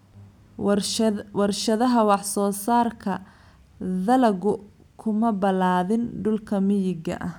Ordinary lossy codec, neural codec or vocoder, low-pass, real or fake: none; none; 19.8 kHz; real